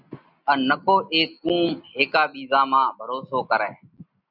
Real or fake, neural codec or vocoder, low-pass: real; none; 5.4 kHz